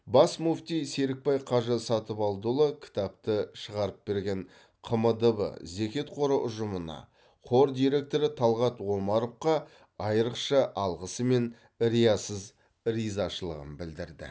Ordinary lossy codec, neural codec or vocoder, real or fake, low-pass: none; none; real; none